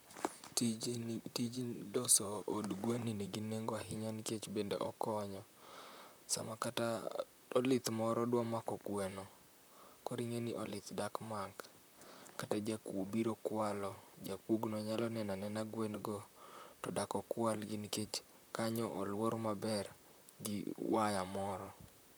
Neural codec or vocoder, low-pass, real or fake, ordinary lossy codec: vocoder, 44.1 kHz, 128 mel bands, Pupu-Vocoder; none; fake; none